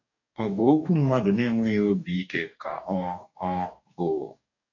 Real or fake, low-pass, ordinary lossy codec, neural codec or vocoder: fake; 7.2 kHz; none; codec, 44.1 kHz, 2.6 kbps, DAC